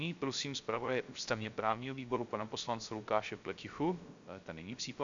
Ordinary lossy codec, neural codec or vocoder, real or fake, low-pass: MP3, 64 kbps; codec, 16 kHz, 0.3 kbps, FocalCodec; fake; 7.2 kHz